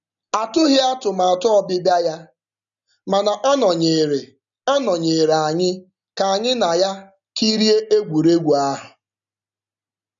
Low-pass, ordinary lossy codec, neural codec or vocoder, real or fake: 7.2 kHz; none; none; real